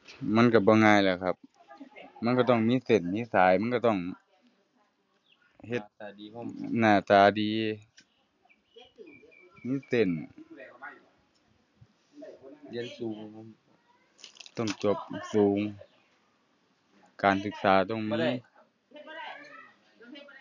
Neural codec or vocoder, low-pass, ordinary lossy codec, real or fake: none; 7.2 kHz; none; real